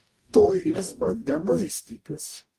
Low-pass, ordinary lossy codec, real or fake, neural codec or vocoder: 14.4 kHz; Opus, 32 kbps; fake; codec, 44.1 kHz, 0.9 kbps, DAC